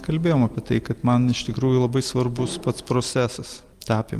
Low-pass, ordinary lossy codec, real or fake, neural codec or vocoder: 14.4 kHz; Opus, 32 kbps; real; none